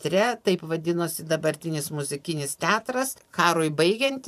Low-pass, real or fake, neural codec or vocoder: 14.4 kHz; fake; vocoder, 44.1 kHz, 128 mel bands every 512 samples, BigVGAN v2